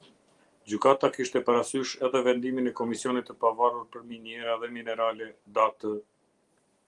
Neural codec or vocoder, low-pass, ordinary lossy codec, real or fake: none; 10.8 kHz; Opus, 24 kbps; real